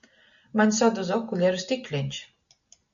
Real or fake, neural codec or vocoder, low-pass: real; none; 7.2 kHz